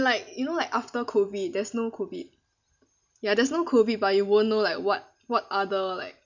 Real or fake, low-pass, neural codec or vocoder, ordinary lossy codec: real; 7.2 kHz; none; none